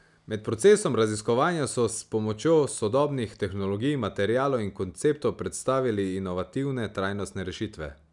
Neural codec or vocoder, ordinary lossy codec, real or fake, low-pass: none; none; real; 10.8 kHz